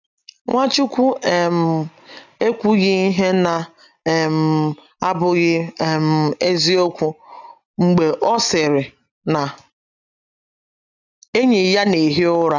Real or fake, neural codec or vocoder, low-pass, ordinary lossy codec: real; none; 7.2 kHz; none